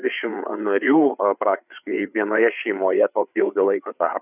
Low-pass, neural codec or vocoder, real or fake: 3.6 kHz; codec, 16 kHz, 4 kbps, FreqCodec, larger model; fake